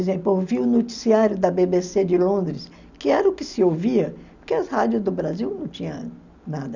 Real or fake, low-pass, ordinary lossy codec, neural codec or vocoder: real; 7.2 kHz; none; none